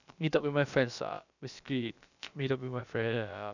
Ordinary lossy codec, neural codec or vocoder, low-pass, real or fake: none; codec, 16 kHz, about 1 kbps, DyCAST, with the encoder's durations; 7.2 kHz; fake